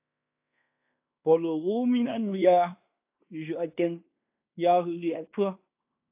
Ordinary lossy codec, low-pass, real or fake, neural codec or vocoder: AAC, 32 kbps; 3.6 kHz; fake; codec, 16 kHz in and 24 kHz out, 0.9 kbps, LongCat-Audio-Codec, fine tuned four codebook decoder